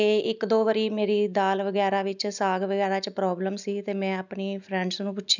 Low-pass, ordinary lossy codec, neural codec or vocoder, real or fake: 7.2 kHz; none; none; real